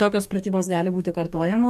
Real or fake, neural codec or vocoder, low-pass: fake; codec, 44.1 kHz, 2.6 kbps, DAC; 14.4 kHz